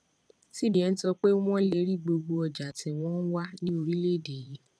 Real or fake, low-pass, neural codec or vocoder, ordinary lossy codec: fake; none; vocoder, 22.05 kHz, 80 mel bands, Vocos; none